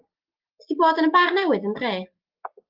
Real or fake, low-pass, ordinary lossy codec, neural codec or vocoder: real; 5.4 kHz; Opus, 32 kbps; none